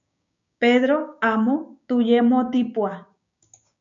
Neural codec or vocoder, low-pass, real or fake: codec, 16 kHz, 6 kbps, DAC; 7.2 kHz; fake